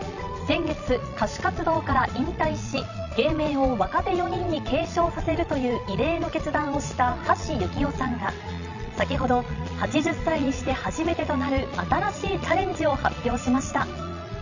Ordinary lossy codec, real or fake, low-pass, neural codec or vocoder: none; fake; 7.2 kHz; vocoder, 22.05 kHz, 80 mel bands, Vocos